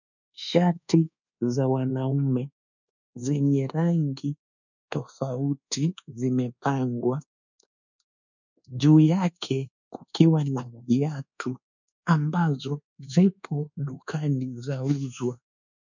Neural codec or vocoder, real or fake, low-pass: codec, 24 kHz, 1.2 kbps, DualCodec; fake; 7.2 kHz